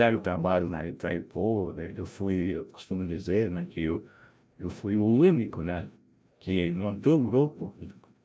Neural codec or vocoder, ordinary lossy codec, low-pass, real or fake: codec, 16 kHz, 0.5 kbps, FreqCodec, larger model; none; none; fake